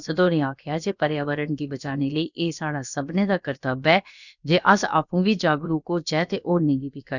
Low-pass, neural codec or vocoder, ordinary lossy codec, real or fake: 7.2 kHz; codec, 16 kHz, about 1 kbps, DyCAST, with the encoder's durations; none; fake